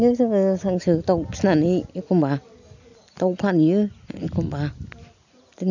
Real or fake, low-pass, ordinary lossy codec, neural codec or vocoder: real; 7.2 kHz; none; none